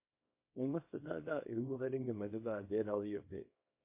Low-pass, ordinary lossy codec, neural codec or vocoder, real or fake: 3.6 kHz; MP3, 24 kbps; codec, 24 kHz, 0.9 kbps, WavTokenizer, small release; fake